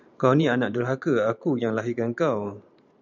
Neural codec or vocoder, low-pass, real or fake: vocoder, 44.1 kHz, 128 mel bands every 512 samples, BigVGAN v2; 7.2 kHz; fake